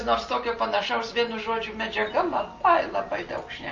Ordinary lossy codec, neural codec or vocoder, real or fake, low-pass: Opus, 24 kbps; none; real; 7.2 kHz